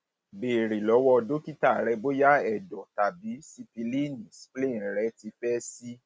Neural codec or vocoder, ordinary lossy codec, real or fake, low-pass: none; none; real; none